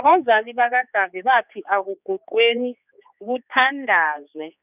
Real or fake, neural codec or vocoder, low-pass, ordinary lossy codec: fake; codec, 16 kHz, 4 kbps, X-Codec, HuBERT features, trained on general audio; 3.6 kHz; none